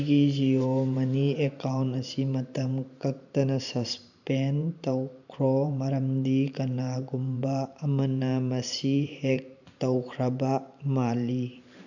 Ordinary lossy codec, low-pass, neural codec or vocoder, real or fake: none; 7.2 kHz; vocoder, 44.1 kHz, 128 mel bands every 512 samples, BigVGAN v2; fake